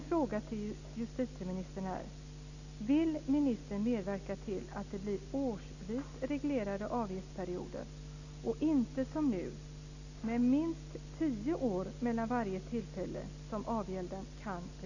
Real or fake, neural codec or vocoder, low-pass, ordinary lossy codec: real; none; 7.2 kHz; none